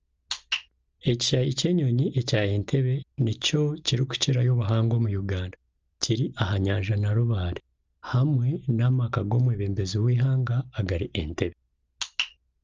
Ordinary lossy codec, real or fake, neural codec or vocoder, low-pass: Opus, 24 kbps; real; none; 7.2 kHz